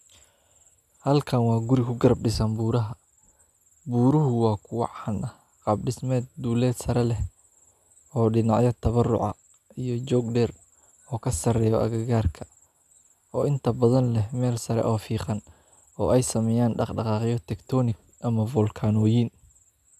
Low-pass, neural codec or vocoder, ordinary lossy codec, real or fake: 14.4 kHz; none; none; real